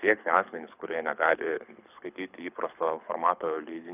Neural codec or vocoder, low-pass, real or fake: codec, 24 kHz, 6 kbps, HILCodec; 3.6 kHz; fake